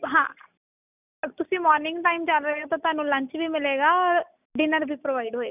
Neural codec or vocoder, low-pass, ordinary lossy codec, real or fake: none; 3.6 kHz; none; real